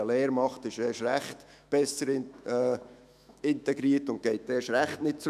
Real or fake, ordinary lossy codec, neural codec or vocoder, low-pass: fake; none; autoencoder, 48 kHz, 128 numbers a frame, DAC-VAE, trained on Japanese speech; 14.4 kHz